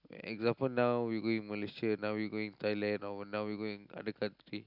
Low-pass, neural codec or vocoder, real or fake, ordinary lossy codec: 5.4 kHz; none; real; none